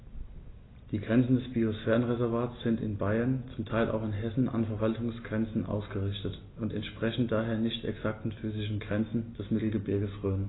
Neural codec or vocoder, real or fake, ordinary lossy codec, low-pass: none; real; AAC, 16 kbps; 7.2 kHz